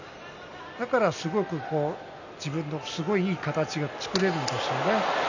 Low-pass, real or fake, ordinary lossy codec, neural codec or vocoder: 7.2 kHz; real; none; none